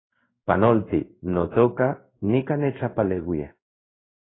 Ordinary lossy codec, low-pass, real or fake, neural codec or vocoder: AAC, 16 kbps; 7.2 kHz; fake; codec, 16 kHz in and 24 kHz out, 1 kbps, XY-Tokenizer